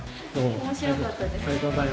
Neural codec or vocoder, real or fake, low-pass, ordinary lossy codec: none; real; none; none